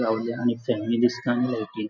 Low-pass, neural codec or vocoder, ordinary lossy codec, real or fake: 7.2 kHz; none; none; real